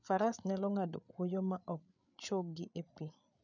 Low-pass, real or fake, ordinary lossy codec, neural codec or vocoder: 7.2 kHz; fake; none; codec, 16 kHz, 8 kbps, FreqCodec, larger model